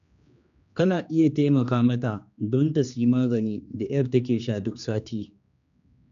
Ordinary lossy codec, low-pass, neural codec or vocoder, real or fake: none; 7.2 kHz; codec, 16 kHz, 2 kbps, X-Codec, HuBERT features, trained on general audio; fake